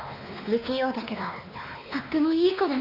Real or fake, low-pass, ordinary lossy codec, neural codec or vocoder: fake; 5.4 kHz; none; codec, 16 kHz, 2 kbps, X-Codec, WavLM features, trained on Multilingual LibriSpeech